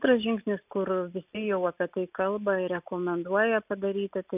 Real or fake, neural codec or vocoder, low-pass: real; none; 3.6 kHz